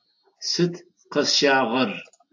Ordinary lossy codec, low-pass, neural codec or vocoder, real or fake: AAC, 48 kbps; 7.2 kHz; none; real